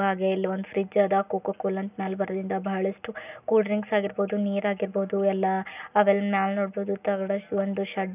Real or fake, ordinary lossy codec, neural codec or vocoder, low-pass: real; none; none; 3.6 kHz